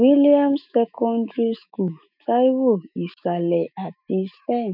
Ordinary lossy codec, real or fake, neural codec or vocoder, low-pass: none; fake; autoencoder, 48 kHz, 128 numbers a frame, DAC-VAE, trained on Japanese speech; 5.4 kHz